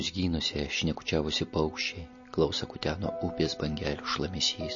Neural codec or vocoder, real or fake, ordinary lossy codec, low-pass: none; real; MP3, 32 kbps; 7.2 kHz